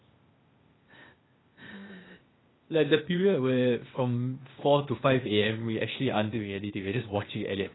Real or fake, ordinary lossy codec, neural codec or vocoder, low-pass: fake; AAC, 16 kbps; codec, 16 kHz, 0.8 kbps, ZipCodec; 7.2 kHz